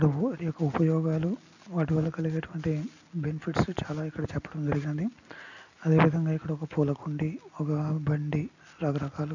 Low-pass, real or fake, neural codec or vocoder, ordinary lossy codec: 7.2 kHz; real; none; none